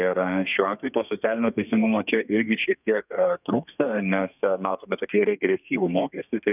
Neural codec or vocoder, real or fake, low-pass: codec, 32 kHz, 1.9 kbps, SNAC; fake; 3.6 kHz